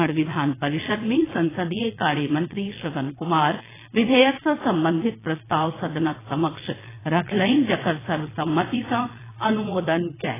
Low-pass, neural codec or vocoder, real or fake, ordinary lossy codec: 3.6 kHz; vocoder, 22.05 kHz, 80 mel bands, Vocos; fake; AAC, 16 kbps